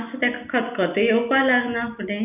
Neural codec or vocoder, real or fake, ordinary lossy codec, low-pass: none; real; none; 3.6 kHz